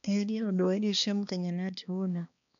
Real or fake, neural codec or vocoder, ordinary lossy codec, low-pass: fake; codec, 16 kHz, 2 kbps, X-Codec, HuBERT features, trained on balanced general audio; none; 7.2 kHz